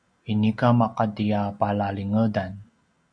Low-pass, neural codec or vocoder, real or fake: 9.9 kHz; none; real